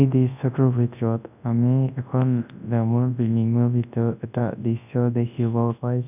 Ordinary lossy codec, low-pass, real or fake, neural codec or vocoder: none; 3.6 kHz; fake; codec, 24 kHz, 0.9 kbps, WavTokenizer, large speech release